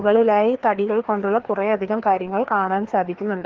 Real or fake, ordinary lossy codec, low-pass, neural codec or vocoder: fake; Opus, 16 kbps; 7.2 kHz; codec, 16 kHz, 2 kbps, FreqCodec, larger model